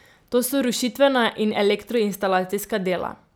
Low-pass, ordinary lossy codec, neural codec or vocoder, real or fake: none; none; none; real